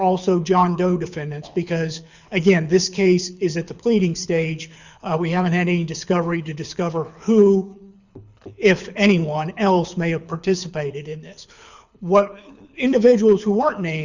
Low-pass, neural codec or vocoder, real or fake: 7.2 kHz; codec, 24 kHz, 6 kbps, HILCodec; fake